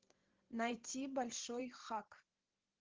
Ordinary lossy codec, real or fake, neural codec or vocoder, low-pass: Opus, 16 kbps; fake; vocoder, 44.1 kHz, 128 mel bands every 512 samples, BigVGAN v2; 7.2 kHz